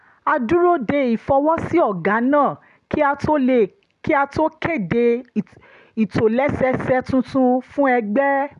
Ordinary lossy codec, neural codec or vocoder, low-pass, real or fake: none; none; 10.8 kHz; real